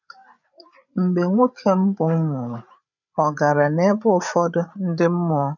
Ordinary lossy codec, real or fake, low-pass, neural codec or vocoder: none; fake; 7.2 kHz; codec, 16 kHz, 8 kbps, FreqCodec, larger model